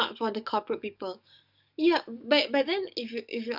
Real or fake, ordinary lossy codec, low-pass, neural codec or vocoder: fake; none; 5.4 kHz; codec, 44.1 kHz, 7.8 kbps, DAC